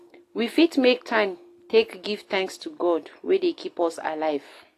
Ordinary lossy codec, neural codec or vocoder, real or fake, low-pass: AAC, 48 kbps; none; real; 14.4 kHz